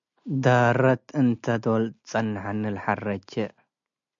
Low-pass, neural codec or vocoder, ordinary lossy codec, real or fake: 7.2 kHz; none; MP3, 64 kbps; real